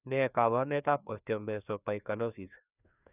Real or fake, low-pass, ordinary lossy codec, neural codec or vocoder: fake; 3.6 kHz; none; codec, 16 kHz, 2 kbps, FreqCodec, larger model